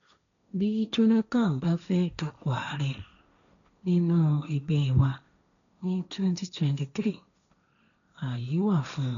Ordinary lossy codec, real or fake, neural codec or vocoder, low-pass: none; fake; codec, 16 kHz, 1.1 kbps, Voila-Tokenizer; 7.2 kHz